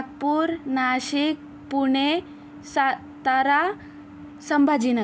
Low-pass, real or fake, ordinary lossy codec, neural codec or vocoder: none; real; none; none